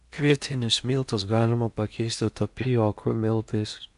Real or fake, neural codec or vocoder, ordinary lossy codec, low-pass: fake; codec, 16 kHz in and 24 kHz out, 0.8 kbps, FocalCodec, streaming, 65536 codes; AAC, 96 kbps; 10.8 kHz